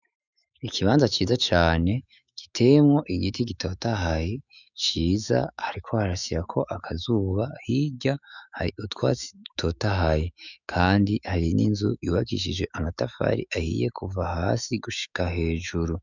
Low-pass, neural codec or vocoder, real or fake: 7.2 kHz; autoencoder, 48 kHz, 128 numbers a frame, DAC-VAE, trained on Japanese speech; fake